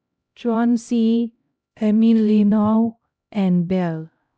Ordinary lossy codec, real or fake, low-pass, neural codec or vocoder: none; fake; none; codec, 16 kHz, 0.5 kbps, X-Codec, HuBERT features, trained on LibriSpeech